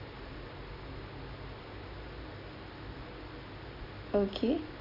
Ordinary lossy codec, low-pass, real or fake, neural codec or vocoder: none; 5.4 kHz; real; none